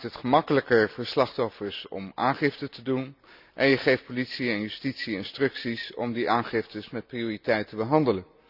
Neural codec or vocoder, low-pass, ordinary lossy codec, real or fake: none; 5.4 kHz; none; real